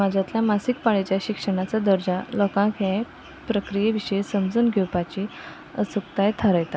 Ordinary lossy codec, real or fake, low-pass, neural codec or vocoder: none; real; none; none